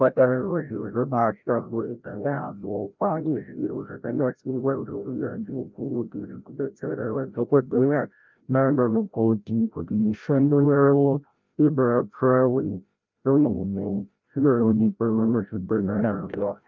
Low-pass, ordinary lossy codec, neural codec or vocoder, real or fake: 7.2 kHz; Opus, 24 kbps; codec, 16 kHz, 0.5 kbps, FreqCodec, larger model; fake